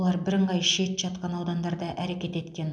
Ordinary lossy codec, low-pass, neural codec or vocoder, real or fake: none; none; none; real